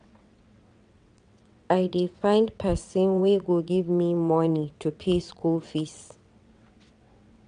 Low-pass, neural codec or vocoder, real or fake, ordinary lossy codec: 9.9 kHz; vocoder, 22.05 kHz, 80 mel bands, WaveNeXt; fake; none